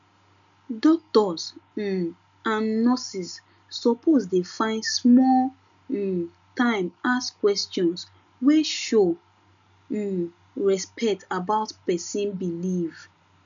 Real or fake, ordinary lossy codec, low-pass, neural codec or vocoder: real; none; 7.2 kHz; none